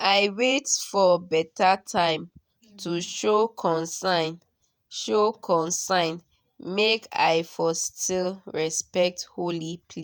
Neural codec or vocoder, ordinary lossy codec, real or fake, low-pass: vocoder, 48 kHz, 128 mel bands, Vocos; none; fake; none